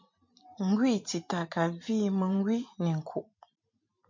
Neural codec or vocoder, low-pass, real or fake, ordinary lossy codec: vocoder, 44.1 kHz, 128 mel bands every 512 samples, BigVGAN v2; 7.2 kHz; fake; MP3, 64 kbps